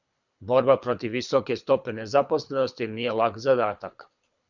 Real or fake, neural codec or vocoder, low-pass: fake; codec, 24 kHz, 6 kbps, HILCodec; 7.2 kHz